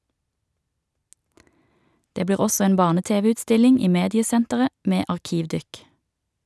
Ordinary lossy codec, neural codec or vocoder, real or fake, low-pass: none; none; real; none